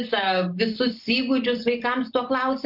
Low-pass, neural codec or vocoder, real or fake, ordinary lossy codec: 5.4 kHz; none; real; MP3, 48 kbps